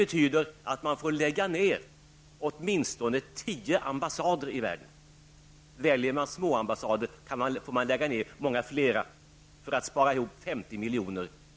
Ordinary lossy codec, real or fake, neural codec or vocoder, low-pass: none; real; none; none